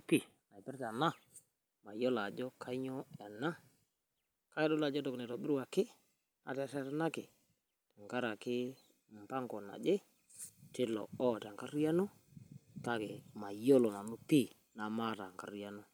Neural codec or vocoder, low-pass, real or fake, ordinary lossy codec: none; none; real; none